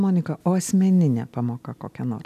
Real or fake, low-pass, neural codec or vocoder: real; 14.4 kHz; none